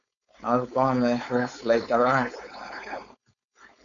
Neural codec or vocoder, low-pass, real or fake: codec, 16 kHz, 4.8 kbps, FACodec; 7.2 kHz; fake